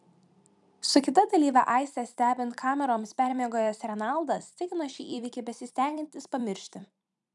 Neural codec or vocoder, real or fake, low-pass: vocoder, 44.1 kHz, 128 mel bands every 256 samples, BigVGAN v2; fake; 10.8 kHz